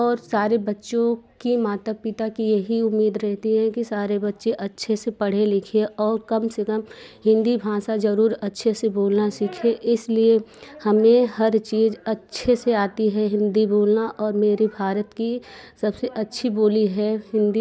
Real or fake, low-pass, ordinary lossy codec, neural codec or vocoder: real; none; none; none